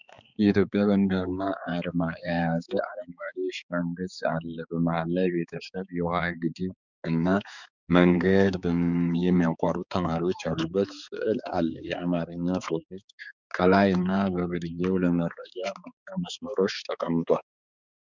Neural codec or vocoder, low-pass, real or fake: codec, 16 kHz, 4 kbps, X-Codec, HuBERT features, trained on balanced general audio; 7.2 kHz; fake